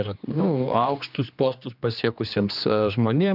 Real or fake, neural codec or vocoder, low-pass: fake; codec, 16 kHz, 2 kbps, X-Codec, HuBERT features, trained on general audio; 5.4 kHz